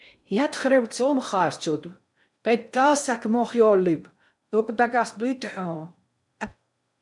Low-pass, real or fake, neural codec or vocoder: 10.8 kHz; fake; codec, 16 kHz in and 24 kHz out, 0.6 kbps, FocalCodec, streaming, 4096 codes